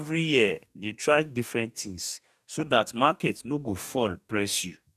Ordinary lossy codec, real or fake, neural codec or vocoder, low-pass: none; fake; codec, 44.1 kHz, 2.6 kbps, DAC; 14.4 kHz